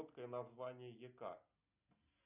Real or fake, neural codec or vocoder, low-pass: real; none; 3.6 kHz